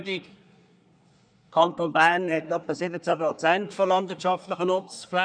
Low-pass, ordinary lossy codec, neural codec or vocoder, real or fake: 9.9 kHz; none; codec, 24 kHz, 1 kbps, SNAC; fake